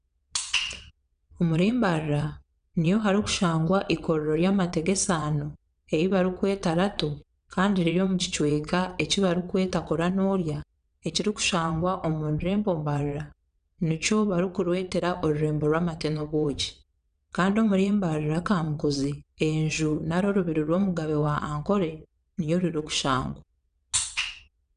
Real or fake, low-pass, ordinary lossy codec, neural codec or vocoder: fake; 9.9 kHz; none; vocoder, 22.05 kHz, 80 mel bands, WaveNeXt